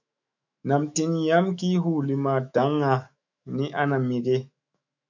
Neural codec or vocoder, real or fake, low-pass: autoencoder, 48 kHz, 128 numbers a frame, DAC-VAE, trained on Japanese speech; fake; 7.2 kHz